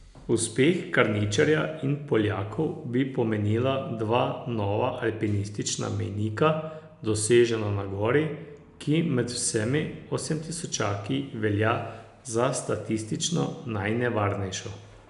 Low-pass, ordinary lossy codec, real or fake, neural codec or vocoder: 10.8 kHz; none; real; none